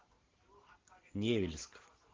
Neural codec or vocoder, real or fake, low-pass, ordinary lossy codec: none; real; 7.2 kHz; Opus, 16 kbps